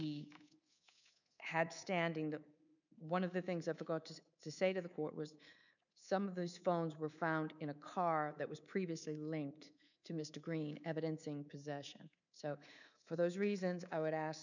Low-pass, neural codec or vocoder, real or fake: 7.2 kHz; codec, 24 kHz, 3.1 kbps, DualCodec; fake